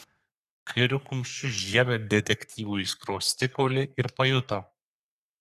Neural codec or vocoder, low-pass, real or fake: codec, 44.1 kHz, 3.4 kbps, Pupu-Codec; 14.4 kHz; fake